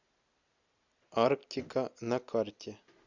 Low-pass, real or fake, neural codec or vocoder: 7.2 kHz; real; none